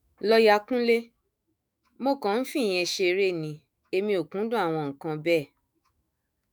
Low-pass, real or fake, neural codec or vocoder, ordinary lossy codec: none; fake; autoencoder, 48 kHz, 128 numbers a frame, DAC-VAE, trained on Japanese speech; none